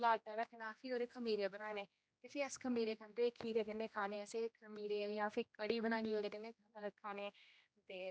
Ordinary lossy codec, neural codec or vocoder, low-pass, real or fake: none; codec, 16 kHz, 1 kbps, X-Codec, HuBERT features, trained on general audio; none; fake